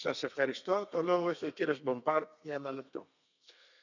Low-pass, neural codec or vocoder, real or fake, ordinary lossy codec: 7.2 kHz; codec, 32 kHz, 1.9 kbps, SNAC; fake; none